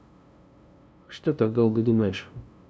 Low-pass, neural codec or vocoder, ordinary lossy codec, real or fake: none; codec, 16 kHz, 0.5 kbps, FunCodec, trained on LibriTTS, 25 frames a second; none; fake